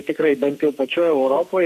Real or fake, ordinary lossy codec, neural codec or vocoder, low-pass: fake; MP3, 96 kbps; codec, 44.1 kHz, 2.6 kbps, SNAC; 14.4 kHz